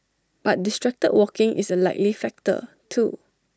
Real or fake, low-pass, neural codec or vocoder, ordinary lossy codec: real; none; none; none